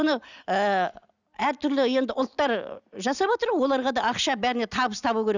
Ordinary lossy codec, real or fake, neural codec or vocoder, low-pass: none; real; none; 7.2 kHz